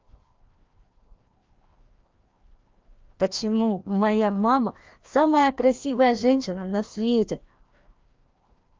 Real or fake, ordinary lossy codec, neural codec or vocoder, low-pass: fake; Opus, 24 kbps; codec, 16 kHz, 1 kbps, FreqCodec, larger model; 7.2 kHz